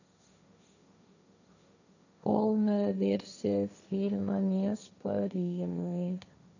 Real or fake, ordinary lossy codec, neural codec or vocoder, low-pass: fake; none; codec, 16 kHz, 1.1 kbps, Voila-Tokenizer; 7.2 kHz